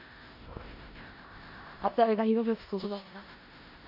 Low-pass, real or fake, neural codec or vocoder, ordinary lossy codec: 5.4 kHz; fake; codec, 16 kHz in and 24 kHz out, 0.4 kbps, LongCat-Audio-Codec, four codebook decoder; MP3, 48 kbps